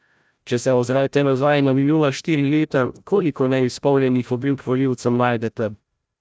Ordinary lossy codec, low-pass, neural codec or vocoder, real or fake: none; none; codec, 16 kHz, 0.5 kbps, FreqCodec, larger model; fake